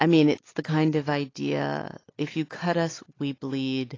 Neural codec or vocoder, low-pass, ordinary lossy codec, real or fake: none; 7.2 kHz; AAC, 32 kbps; real